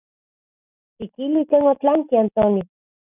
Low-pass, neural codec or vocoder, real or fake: 3.6 kHz; none; real